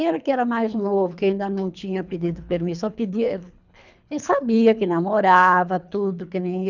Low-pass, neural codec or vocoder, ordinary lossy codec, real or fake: 7.2 kHz; codec, 24 kHz, 3 kbps, HILCodec; none; fake